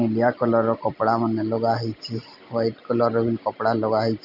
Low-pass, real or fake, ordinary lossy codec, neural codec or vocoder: 5.4 kHz; real; none; none